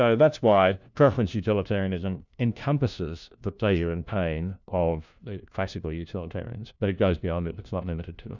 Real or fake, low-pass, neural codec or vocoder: fake; 7.2 kHz; codec, 16 kHz, 1 kbps, FunCodec, trained on LibriTTS, 50 frames a second